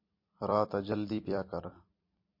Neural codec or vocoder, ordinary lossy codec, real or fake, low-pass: vocoder, 44.1 kHz, 128 mel bands every 256 samples, BigVGAN v2; MP3, 32 kbps; fake; 5.4 kHz